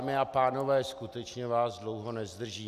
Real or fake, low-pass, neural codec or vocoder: real; 14.4 kHz; none